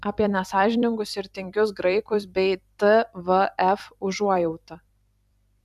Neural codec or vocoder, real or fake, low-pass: vocoder, 44.1 kHz, 128 mel bands every 256 samples, BigVGAN v2; fake; 14.4 kHz